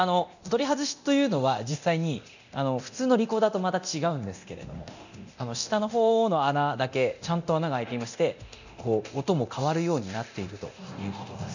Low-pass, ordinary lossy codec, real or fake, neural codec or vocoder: 7.2 kHz; none; fake; codec, 24 kHz, 0.9 kbps, DualCodec